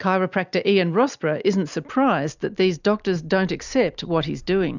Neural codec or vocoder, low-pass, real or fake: none; 7.2 kHz; real